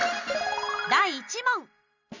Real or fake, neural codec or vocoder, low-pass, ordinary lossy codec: real; none; 7.2 kHz; none